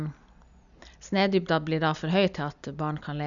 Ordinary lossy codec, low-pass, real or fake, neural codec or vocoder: none; 7.2 kHz; real; none